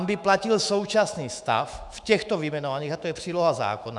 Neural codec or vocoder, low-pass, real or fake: autoencoder, 48 kHz, 128 numbers a frame, DAC-VAE, trained on Japanese speech; 10.8 kHz; fake